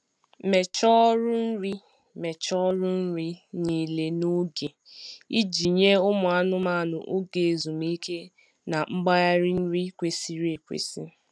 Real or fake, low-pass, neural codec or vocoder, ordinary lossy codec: real; none; none; none